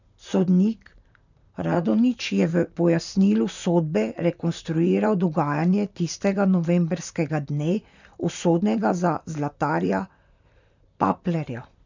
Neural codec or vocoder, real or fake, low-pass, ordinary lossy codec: vocoder, 44.1 kHz, 128 mel bands, Pupu-Vocoder; fake; 7.2 kHz; none